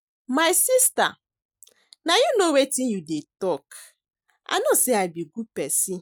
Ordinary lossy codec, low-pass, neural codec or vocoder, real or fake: none; none; none; real